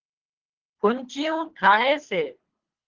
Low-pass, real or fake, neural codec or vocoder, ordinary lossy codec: 7.2 kHz; fake; codec, 24 kHz, 3 kbps, HILCodec; Opus, 16 kbps